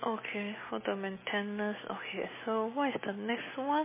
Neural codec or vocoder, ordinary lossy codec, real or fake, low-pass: none; MP3, 16 kbps; real; 3.6 kHz